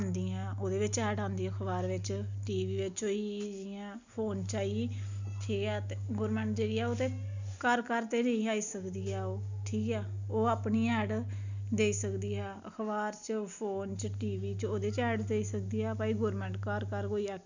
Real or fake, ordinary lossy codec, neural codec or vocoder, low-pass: real; none; none; 7.2 kHz